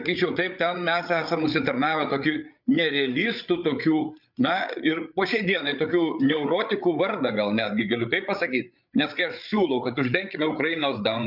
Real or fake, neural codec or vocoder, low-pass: fake; codec, 16 kHz, 8 kbps, FreqCodec, larger model; 5.4 kHz